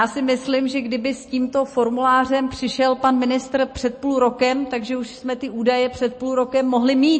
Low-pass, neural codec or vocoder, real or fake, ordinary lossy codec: 9.9 kHz; none; real; MP3, 32 kbps